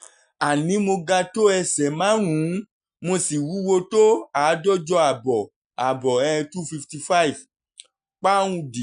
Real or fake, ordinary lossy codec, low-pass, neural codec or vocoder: real; none; 9.9 kHz; none